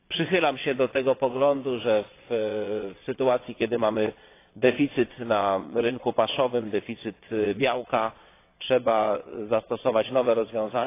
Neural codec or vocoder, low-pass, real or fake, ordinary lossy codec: vocoder, 22.05 kHz, 80 mel bands, WaveNeXt; 3.6 kHz; fake; AAC, 24 kbps